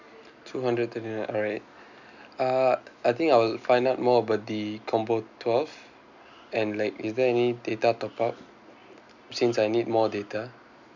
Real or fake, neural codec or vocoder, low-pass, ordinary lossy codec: real; none; 7.2 kHz; none